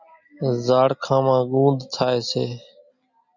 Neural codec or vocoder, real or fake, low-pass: none; real; 7.2 kHz